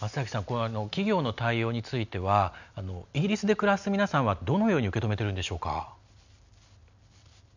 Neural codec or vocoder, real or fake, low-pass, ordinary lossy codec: none; real; 7.2 kHz; none